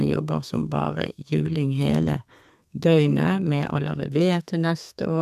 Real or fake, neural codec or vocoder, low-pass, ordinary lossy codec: fake; codec, 32 kHz, 1.9 kbps, SNAC; 14.4 kHz; none